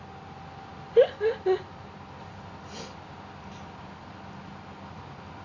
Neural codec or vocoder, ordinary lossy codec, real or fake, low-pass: none; Opus, 64 kbps; real; 7.2 kHz